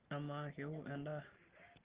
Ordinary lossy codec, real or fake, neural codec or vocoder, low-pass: Opus, 16 kbps; real; none; 3.6 kHz